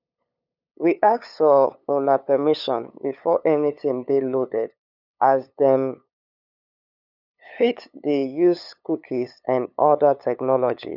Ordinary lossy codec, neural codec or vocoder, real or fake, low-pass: none; codec, 16 kHz, 8 kbps, FunCodec, trained on LibriTTS, 25 frames a second; fake; 5.4 kHz